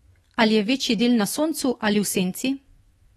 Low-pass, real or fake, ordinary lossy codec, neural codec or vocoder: 19.8 kHz; fake; AAC, 32 kbps; autoencoder, 48 kHz, 128 numbers a frame, DAC-VAE, trained on Japanese speech